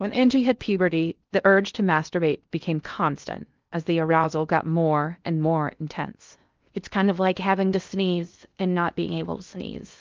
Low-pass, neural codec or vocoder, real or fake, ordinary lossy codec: 7.2 kHz; codec, 16 kHz in and 24 kHz out, 0.6 kbps, FocalCodec, streaming, 2048 codes; fake; Opus, 24 kbps